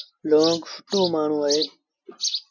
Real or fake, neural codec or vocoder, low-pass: real; none; 7.2 kHz